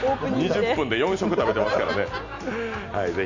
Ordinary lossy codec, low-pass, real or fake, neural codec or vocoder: none; 7.2 kHz; real; none